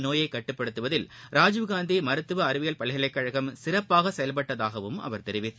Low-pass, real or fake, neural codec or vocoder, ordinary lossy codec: none; real; none; none